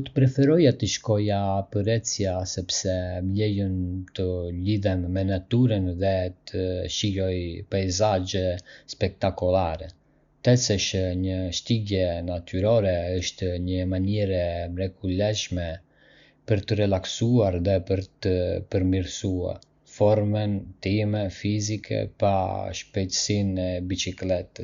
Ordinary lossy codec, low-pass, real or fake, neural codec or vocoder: Opus, 64 kbps; 7.2 kHz; real; none